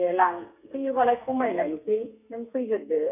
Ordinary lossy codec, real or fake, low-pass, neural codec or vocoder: none; fake; 3.6 kHz; codec, 32 kHz, 1.9 kbps, SNAC